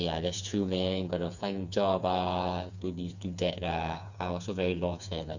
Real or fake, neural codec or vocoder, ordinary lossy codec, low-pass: fake; codec, 16 kHz, 4 kbps, FreqCodec, smaller model; none; 7.2 kHz